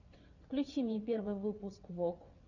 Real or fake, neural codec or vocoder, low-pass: fake; vocoder, 22.05 kHz, 80 mel bands, WaveNeXt; 7.2 kHz